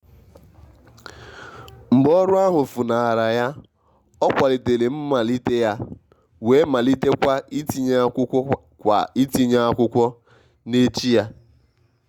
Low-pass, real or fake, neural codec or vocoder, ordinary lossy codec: 19.8 kHz; real; none; Opus, 64 kbps